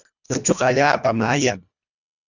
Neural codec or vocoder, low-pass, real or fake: codec, 24 kHz, 1.5 kbps, HILCodec; 7.2 kHz; fake